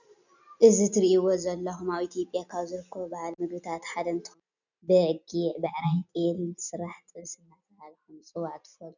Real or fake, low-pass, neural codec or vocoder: real; 7.2 kHz; none